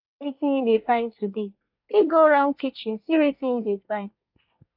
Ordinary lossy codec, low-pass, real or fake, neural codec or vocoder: none; 5.4 kHz; fake; codec, 24 kHz, 1 kbps, SNAC